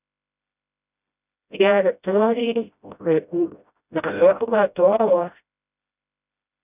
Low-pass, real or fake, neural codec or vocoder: 3.6 kHz; fake; codec, 16 kHz, 0.5 kbps, FreqCodec, smaller model